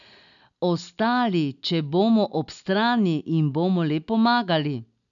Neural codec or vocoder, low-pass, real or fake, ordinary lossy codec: none; 7.2 kHz; real; none